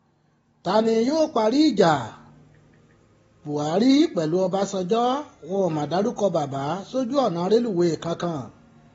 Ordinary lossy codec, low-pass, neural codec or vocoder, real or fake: AAC, 24 kbps; 19.8 kHz; none; real